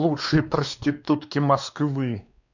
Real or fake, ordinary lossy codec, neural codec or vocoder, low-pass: fake; none; codec, 16 kHz, 2 kbps, X-Codec, WavLM features, trained on Multilingual LibriSpeech; 7.2 kHz